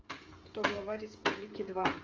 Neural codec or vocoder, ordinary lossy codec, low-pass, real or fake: codec, 16 kHz, 16 kbps, FreqCodec, smaller model; Opus, 32 kbps; 7.2 kHz; fake